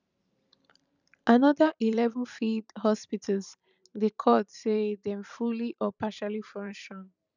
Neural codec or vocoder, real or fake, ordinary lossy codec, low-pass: vocoder, 44.1 kHz, 128 mel bands every 512 samples, BigVGAN v2; fake; none; 7.2 kHz